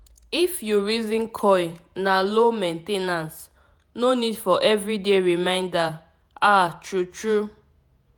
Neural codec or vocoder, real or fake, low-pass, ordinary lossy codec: vocoder, 48 kHz, 128 mel bands, Vocos; fake; none; none